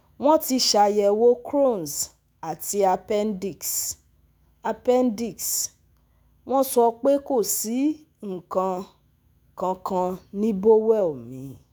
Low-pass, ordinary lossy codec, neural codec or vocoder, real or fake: none; none; autoencoder, 48 kHz, 128 numbers a frame, DAC-VAE, trained on Japanese speech; fake